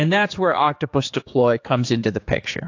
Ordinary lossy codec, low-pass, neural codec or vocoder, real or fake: AAC, 48 kbps; 7.2 kHz; codec, 16 kHz, 4 kbps, X-Codec, HuBERT features, trained on general audio; fake